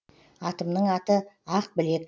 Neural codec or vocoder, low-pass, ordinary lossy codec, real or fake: none; none; none; real